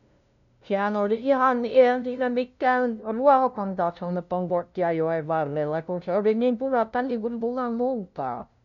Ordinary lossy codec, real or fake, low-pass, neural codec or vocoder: none; fake; 7.2 kHz; codec, 16 kHz, 0.5 kbps, FunCodec, trained on LibriTTS, 25 frames a second